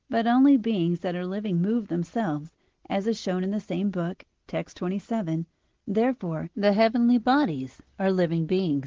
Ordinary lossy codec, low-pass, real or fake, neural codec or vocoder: Opus, 16 kbps; 7.2 kHz; real; none